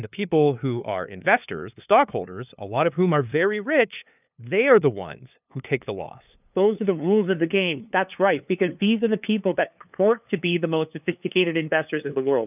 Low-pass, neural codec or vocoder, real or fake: 3.6 kHz; codec, 16 kHz, 2 kbps, FunCodec, trained on LibriTTS, 25 frames a second; fake